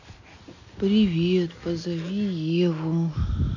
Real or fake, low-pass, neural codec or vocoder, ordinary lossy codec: real; 7.2 kHz; none; none